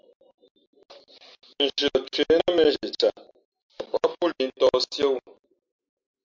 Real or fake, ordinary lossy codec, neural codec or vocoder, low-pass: real; MP3, 64 kbps; none; 7.2 kHz